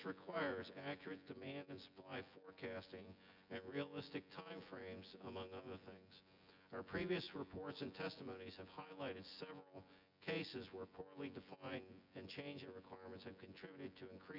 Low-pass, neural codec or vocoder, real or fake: 5.4 kHz; vocoder, 24 kHz, 100 mel bands, Vocos; fake